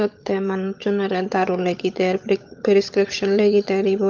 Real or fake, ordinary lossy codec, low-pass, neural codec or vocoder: fake; Opus, 24 kbps; 7.2 kHz; codec, 16 kHz, 16 kbps, FunCodec, trained on LibriTTS, 50 frames a second